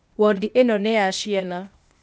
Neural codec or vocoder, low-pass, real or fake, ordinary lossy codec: codec, 16 kHz, 0.8 kbps, ZipCodec; none; fake; none